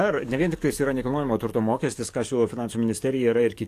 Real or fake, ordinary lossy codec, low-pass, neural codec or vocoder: fake; AAC, 64 kbps; 14.4 kHz; codec, 44.1 kHz, 7.8 kbps, DAC